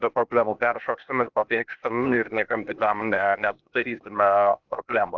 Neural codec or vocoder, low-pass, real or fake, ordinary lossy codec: codec, 16 kHz, 0.8 kbps, ZipCodec; 7.2 kHz; fake; Opus, 32 kbps